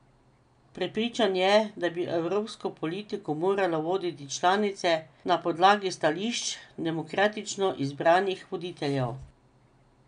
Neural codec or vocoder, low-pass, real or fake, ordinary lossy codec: none; 9.9 kHz; real; none